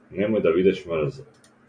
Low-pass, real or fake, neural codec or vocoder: 9.9 kHz; real; none